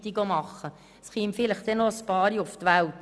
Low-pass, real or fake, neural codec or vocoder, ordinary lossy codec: none; real; none; none